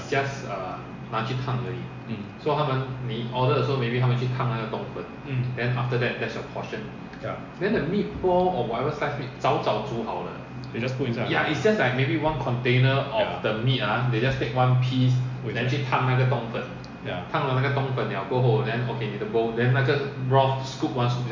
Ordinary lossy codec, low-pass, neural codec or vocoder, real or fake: MP3, 48 kbps; 7.2 kHz; none; real